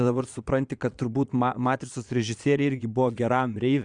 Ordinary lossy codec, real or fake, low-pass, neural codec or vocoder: Opus, 64 kbps; fake; 9.9 kHz; vocoder, 22.05 kHz, 80 mel bands, Vocos